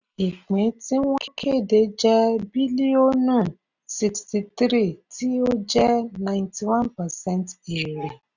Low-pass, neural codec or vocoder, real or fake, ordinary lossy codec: 7.2 kHz; none; real; none